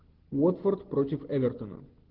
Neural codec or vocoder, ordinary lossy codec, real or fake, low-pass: none; Opus, 16 kbps; real; 5.4 kHz